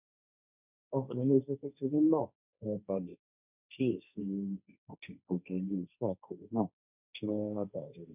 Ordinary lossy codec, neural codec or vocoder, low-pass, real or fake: none; codec, 16 kHz, 1.1 kbps, Voila-Tokenizer; 3.6 kHz; fake